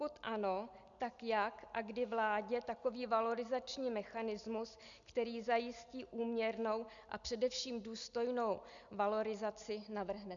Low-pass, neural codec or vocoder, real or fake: 7.2 kHz; none; real